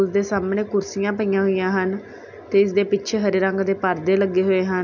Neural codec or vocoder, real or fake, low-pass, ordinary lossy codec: none; real; 7.2 kHz; none